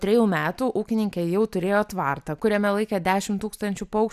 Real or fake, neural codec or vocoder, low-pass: real; none; 14.4 kHz